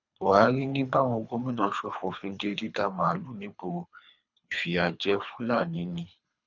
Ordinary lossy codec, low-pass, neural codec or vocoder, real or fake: none; 7.2 kHz; codec, 24 kHz, 3 kbps, HILCodec; fake